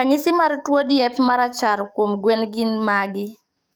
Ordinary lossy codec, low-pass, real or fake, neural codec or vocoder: none; none; fake; codec, 44.1 kHz, 7.8 kbps, DAC